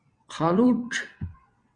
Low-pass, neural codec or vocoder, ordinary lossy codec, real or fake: 9.9 kHz; vocoder, 22.05 kHz, 80 mel bands, WaveNeXt; Opus, 64 kbps; fake